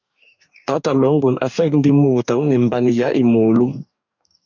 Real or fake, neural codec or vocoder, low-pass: fake; codec, 44.1 kHz, 2.6 kbps, DAC; 7.2 kHz